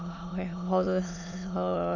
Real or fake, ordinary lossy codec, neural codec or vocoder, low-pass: fake; none; autoencoder, 22.05 kHz, a latent of 192 numbers a frame, VITS, trained on many speakers; 7.2 kHz